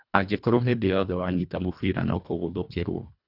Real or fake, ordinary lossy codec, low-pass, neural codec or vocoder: fake; none; 5.4 kHz; codec, 24 kHz, 1.5 kbps, HILCodec